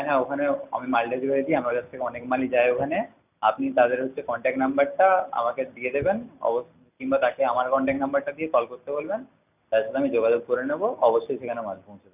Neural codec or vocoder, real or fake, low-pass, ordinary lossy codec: none; real; 3.6 kHz; none